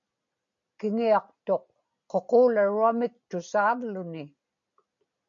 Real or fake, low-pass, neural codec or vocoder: real; 7.2 kHz; none